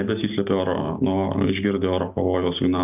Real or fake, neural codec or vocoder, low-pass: real; none; 3.6 kHz